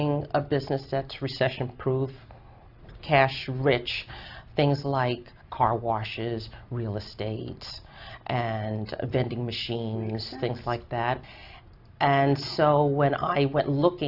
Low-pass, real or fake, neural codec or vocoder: 5.4 kHz; real; none